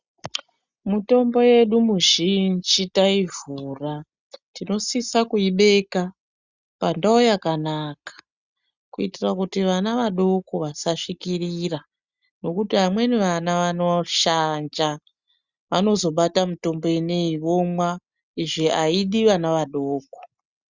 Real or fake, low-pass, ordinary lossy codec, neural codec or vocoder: real; 7.2 kHz; Opus, 64 kbps; none